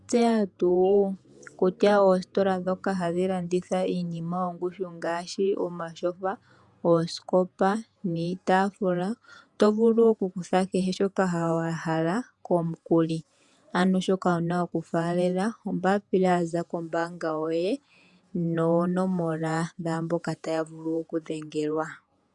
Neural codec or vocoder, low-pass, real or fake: vocoder, 48 kHz, 128 mel bands, Vocos; 10.8 kHz; fake